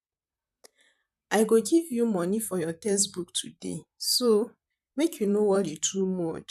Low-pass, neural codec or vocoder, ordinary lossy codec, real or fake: 14.4 kHz; vocoder, 44.1 kHz, 128 mel bands, Pupu-Vocoder; none; fake